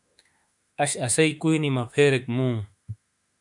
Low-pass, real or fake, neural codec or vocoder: 10.8 kHz; fake; autoencoder, 48 kHz, 32 numbers a frame, DAC-VAE, trained on Japanese speech